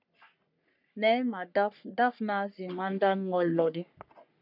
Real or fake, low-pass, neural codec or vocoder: fake; 5.4 kHz; codec, 44.1 kHz, 3.4 kbps, Pupu-Codec